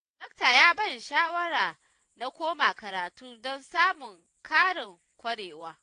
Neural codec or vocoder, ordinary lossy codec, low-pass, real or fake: vocoder, 22.05 kHz, 80 mel bands, WaveNeXt; AAC, 48 kbps; 9.9 kHz; fake